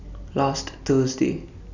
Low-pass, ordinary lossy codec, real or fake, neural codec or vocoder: 7.2 kHz; none; real; none